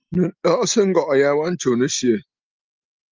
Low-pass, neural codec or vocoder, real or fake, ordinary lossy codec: 7.2 kHz; none; real; Opus, 32 kbps